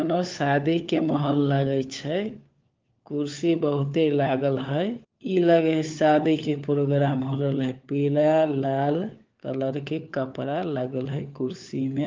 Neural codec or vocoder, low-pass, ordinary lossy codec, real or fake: codec, 16 kHz, 4 kbps, FunCodec, trained on LibriTTS, 50 frames a second; 7.2 kHz; Opus, 32 kbps; fake